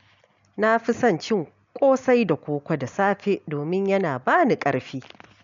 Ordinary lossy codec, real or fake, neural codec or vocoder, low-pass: MP3, 64 kbps; real; none; 7.2 kHz